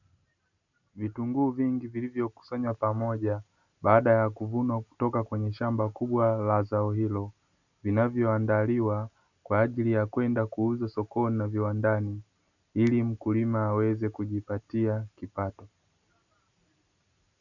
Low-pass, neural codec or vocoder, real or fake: 7.2 kHz; none; real